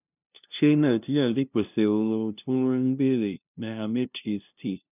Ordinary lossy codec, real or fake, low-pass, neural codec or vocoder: none; fake; 3.6 kHz; codec, 16 kHz, 0.5 kbps, FunCodec, trained on LibriTTS, 25 frames a second